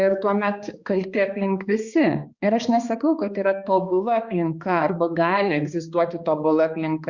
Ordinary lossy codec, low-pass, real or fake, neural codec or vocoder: Opus, 64 kbps; 7.2 kHz; fake; codec, 16 kHz, 2 kbps, X-Codec, HuBERT features, trained on balanced general audio